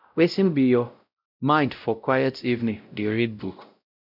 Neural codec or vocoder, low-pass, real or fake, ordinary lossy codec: codec, 16 kHz, 0.5 kbps, X-Codec, WavLM features, trained on Multilingual LibriSpeech; 5.4 kHz; fake; none